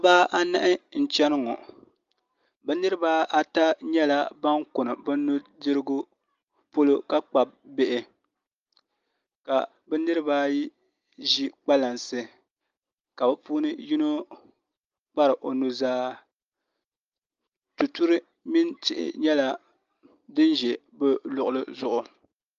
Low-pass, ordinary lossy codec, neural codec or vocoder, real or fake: 7.2 kHz; Opus, 32 kbps; none; real